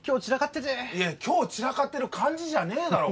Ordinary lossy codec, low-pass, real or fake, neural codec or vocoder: none; none; real; none